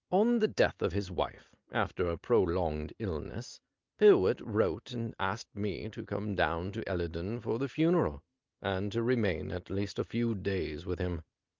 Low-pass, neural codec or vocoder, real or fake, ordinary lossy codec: 7.2 kHz; none; real; Opus, 32 kbps